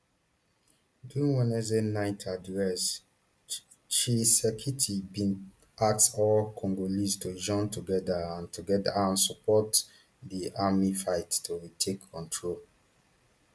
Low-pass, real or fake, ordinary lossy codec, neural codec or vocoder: none; real; none; none